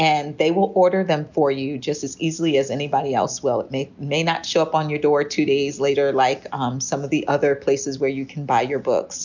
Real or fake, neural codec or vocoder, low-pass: real; none; 7.2 kHz